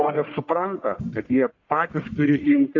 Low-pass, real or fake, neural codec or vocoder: 7.2 kHz; fake; codec, 44.1 kHz, 1.7 kbps, Pupu-Codec